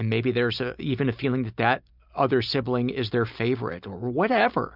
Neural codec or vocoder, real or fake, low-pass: none; real; 5.4 kHz